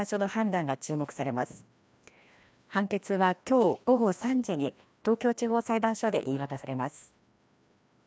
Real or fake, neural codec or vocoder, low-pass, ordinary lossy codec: fake; codec, 16 kHz, 1 kbps, FreqCodec, larger model; none; none